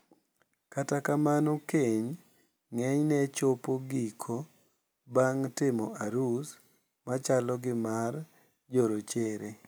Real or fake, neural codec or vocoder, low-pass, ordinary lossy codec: real; none; none; none